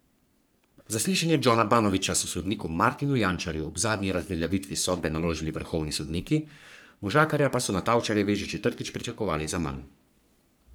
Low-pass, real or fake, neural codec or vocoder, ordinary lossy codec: none; fake; codec, 44.1 kHz, 3.4 kbps, Pupu-Codec; none